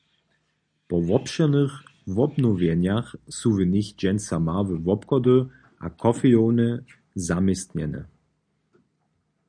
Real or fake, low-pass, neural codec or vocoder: real; 9.9 kHz; none